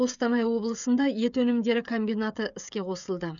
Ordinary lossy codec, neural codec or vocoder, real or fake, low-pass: none; codec, 16 kHz, 16 kbps, FreqCodec, smaller model; fake; 7.2 kHz